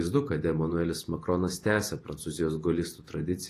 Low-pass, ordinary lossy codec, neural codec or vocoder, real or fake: 14.4 kHz; AAC, 48 kbps; vocoder, 48 kHz, 128 mel bands, Vocos; fake